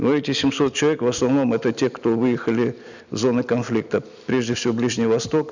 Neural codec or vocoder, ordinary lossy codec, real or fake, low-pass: none; none; real; 7.2 kHz